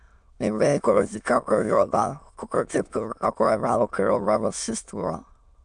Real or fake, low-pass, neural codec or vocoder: fake; 9.9 kHz; autoencoder, 22.05 kHz, a latent of 192 numbers a frame, VITS, trained on many speakers